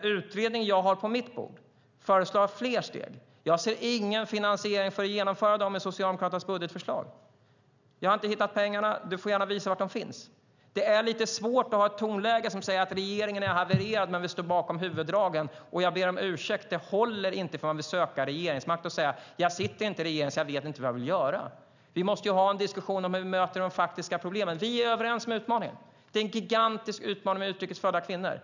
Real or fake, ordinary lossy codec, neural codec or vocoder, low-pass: real; none; none; 7.2 kHz